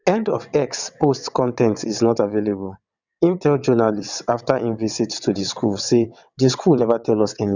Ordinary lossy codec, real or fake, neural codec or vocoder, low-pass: none; fake; vocoder, 22.05 kHz, 80 mel bands, WaveNeXt; 7.2 kHz